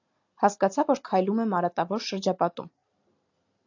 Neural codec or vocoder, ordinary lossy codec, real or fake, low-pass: none; AAC, 48 kbps; real; 7.2 kHz